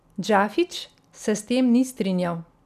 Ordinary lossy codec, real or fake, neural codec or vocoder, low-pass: none; real; none; 14.4 kHz